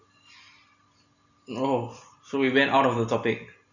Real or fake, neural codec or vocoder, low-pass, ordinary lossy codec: real; none; 7.2 kHz; none